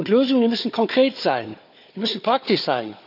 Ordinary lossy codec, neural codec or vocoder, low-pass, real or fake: none; codec, 16 kHz, 4 kbps, FunCodec, trained on Chinese and English, 50 frames a second; 5.4 kHz; fake